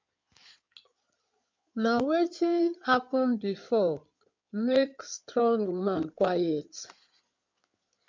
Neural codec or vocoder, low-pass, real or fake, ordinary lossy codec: codec, 16 kHz in and 24 kHz out, 2.2 kbps, FireRedTTS-2 codec; 7.2 kHz; fake; MP3, 64 kbps